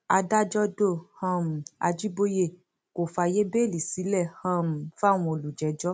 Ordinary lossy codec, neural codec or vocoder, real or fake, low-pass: none; none; real; none